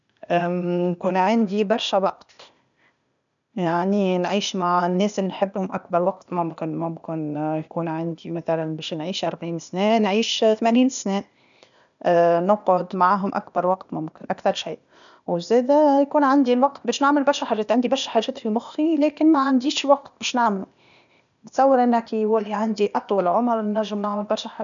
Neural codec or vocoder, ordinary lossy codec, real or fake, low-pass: codec, 16 kHz, 0.8 kbps, ZipCodec; none; fake; 7.2 kHz